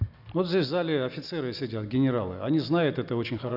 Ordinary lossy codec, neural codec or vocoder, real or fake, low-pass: none; none; real; 5.4 kHz